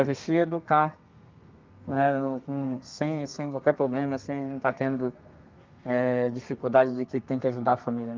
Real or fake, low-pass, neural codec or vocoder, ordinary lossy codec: fake; 7.2 kHz; codec, 32 kHz, 1.9 kbps, SNAC; Opus, 24 kbps